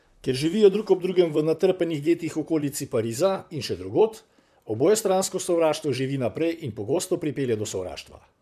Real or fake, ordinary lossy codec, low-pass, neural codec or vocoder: fake; MP3, 96 kbps; 14.4 kHz; vocoder, 44.1 kHz, 128 mel bands, Pupu-Vocoder